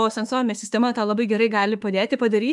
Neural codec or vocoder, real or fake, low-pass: autoencoder, 48 kHz, 32 numbers a frame, DAC-VAE, trained on Japanese speech; fake; 10.8 kHz